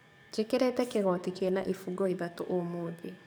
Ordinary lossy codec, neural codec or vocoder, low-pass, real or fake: none; codec, 44.1 kHz, 7.8 kbps, DAC; none; fake